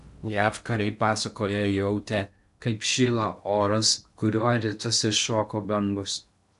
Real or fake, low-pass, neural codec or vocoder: fake; 10.8 kHz; codec, 16 kHz in and 24 kHz out, 0.6 kbps, FocalCodec, streaming, 2048 codes